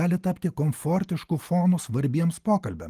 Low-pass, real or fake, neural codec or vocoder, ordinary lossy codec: 14.4 kHz; real; none; Opus, 24 kbps